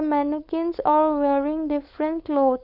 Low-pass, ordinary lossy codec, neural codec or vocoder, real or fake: 5.4 kHz; none; codec, 16 kHz, 4.8 kbps, FACodec; fake